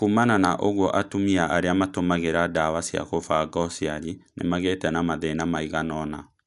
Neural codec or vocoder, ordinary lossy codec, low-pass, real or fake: none; none; 10.8 kHz; real